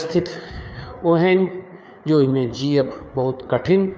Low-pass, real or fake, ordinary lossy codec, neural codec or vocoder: none; fake; none; codec, 16 kHz, 4 kbps, FreqCodec, larger model